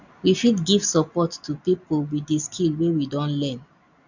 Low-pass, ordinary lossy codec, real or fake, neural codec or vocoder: 7.2 kHz; none; real; none